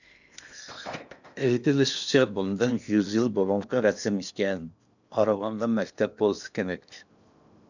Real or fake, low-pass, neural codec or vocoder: fake; 7.2 kHz; codec, 16 kHz in and 24 kHz out, 0.8 kbps, FocalCodec, streaming, 65536 codes